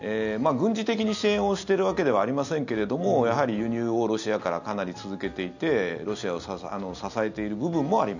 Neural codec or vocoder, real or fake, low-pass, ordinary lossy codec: none; real; 7.2 kHz; none